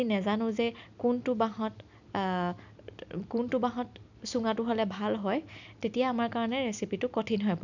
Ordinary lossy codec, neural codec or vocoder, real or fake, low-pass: none; none; real; 7.2 kHz